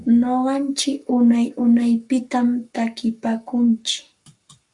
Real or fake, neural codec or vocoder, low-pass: fake; codec, 44.1 kHz, 7.8 kbps, Pupu-Codec; 10.8 kHz